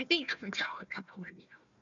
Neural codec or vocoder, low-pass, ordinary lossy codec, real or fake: codec, 16 kHz, 1 kbps, FunCodec, trained on Chinese and English, 50 frames a second; 7.2 kHz; MP3, 96 kbps; fake